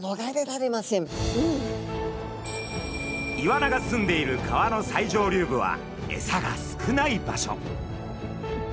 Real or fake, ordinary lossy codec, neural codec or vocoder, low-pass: real; none; none; none